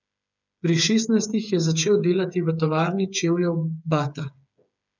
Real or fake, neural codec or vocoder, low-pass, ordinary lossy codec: fake; codec, 16 kHz, 8 kbps, FreqCodec, smaller model; 7.2 kHz; none